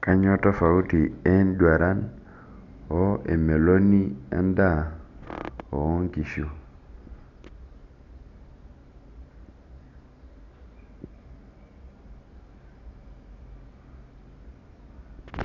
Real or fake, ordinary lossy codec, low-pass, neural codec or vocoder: real; none; 7.2 kHz; none